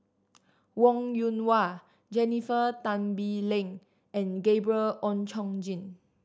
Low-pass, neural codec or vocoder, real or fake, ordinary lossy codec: none; none; real; none